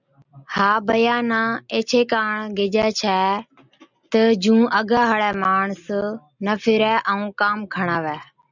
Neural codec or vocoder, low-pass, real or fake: none; 7.2 kHz; real